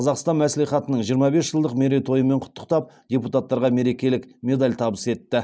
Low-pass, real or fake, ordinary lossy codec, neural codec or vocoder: none; real; none; none